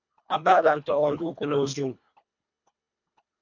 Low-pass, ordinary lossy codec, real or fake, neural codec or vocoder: 7.2 kHz; MP3, 48 kbps; fake; codec, 24 kHz, 1.5 kbps, HILCodec